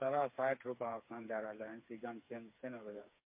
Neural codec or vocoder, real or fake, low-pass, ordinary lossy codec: codec, 16 kHz, 4 kbps, FreqCodec, smaller model; fake; 3.6 kHz; MP3, 24 kbps